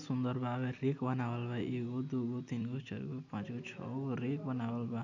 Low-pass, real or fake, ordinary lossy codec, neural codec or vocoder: 7.2 kHz; real; none; none